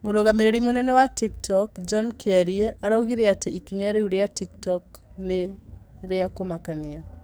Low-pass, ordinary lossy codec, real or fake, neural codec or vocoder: none; none; fake; codec, 44.1 kHz, 2.6 kbps, SNAC